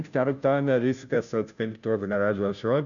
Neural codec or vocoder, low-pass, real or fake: codec, 16 kHz, 0.5 kbps, FunCodec, trained on Chinese and English, 25 frames a second; 7.2 kHz; fake